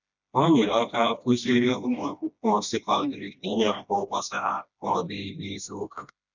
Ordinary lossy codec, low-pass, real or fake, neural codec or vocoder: none; 7.2 kHz; fake; codec, 16 kHz, 1 kbps, FreqCodec, smaller model